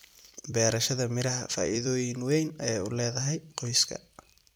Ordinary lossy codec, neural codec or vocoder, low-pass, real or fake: none; vocoder, 44.1 kHz, 128 mel bands every 256 samples, BigVGAN v2; none; fake